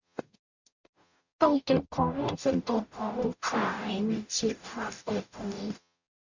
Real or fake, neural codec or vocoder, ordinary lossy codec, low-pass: fake; codec, 44.1 kHz, 0.9 kbps, DAC; none; 7.2 kHz